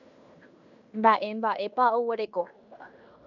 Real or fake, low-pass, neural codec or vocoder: fake; 7.2 kHz; codec, 16 kHz in and 24 kHz out, 0.9 kbps, LongCat-Audio-Codec, fine tuned four codebook decoder